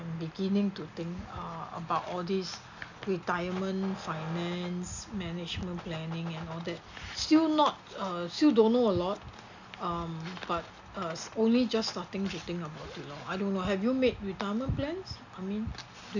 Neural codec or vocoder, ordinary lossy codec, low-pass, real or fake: none; none; 7.2 kHz; real